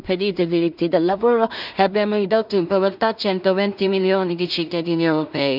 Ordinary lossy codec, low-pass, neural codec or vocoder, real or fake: none; 5.4 kHz; codec, 16 kHz in and 24 kHz out, 0.4 kbps, LongCat-Audio-Codec, two codebook decoder; fake